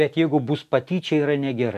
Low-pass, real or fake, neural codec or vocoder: 14.4 kHz; real; none